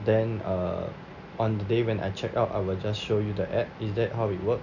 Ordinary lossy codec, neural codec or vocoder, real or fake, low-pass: none; none; real; 7.2 kHz